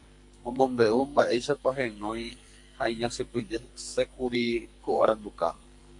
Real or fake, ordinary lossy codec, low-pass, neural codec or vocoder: fake; MP3, 64 kbps; 10.8 kHz; codec, 44.1 kHz, 2.6 kbps, SNAC